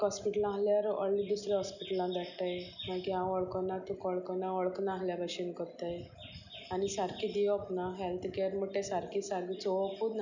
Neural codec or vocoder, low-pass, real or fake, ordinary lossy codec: none; 7.2 kHz; real; none